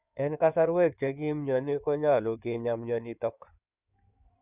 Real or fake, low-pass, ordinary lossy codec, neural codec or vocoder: fake; 3.6 kHz; none; codec, 16 kHz in and 24 kHz out, 2.2 kbps, FireRedTTS-2 codec